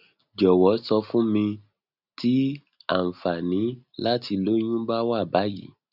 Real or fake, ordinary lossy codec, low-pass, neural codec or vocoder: real; none; 5.4 kHz; none